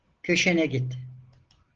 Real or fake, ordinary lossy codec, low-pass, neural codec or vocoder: real; Opus, 16 kbps; 7.2 kHz; none